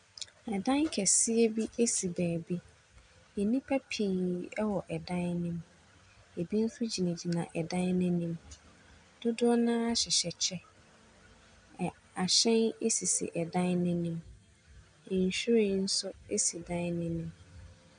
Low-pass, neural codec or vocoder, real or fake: 9.9 kHz; none; real